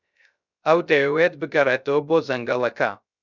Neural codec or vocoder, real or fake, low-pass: codec, 16 kHz, 0.3 kbps, FocalCodec; fake; 7.2 kHz